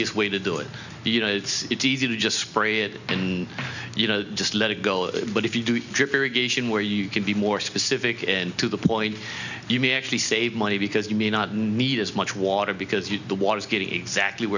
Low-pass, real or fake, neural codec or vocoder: 7.2 kHz; real; none